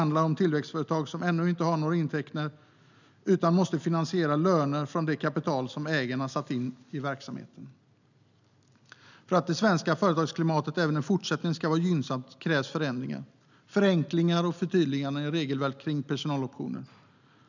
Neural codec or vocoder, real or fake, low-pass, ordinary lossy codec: none; real; 7.2 kHz; none